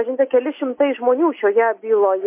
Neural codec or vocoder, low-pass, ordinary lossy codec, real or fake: none; 3.6 kHz; MP3, 24 kbps; real